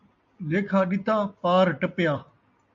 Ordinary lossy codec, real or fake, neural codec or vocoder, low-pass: MP3, 64 kbps; real; none; 7.2 kHz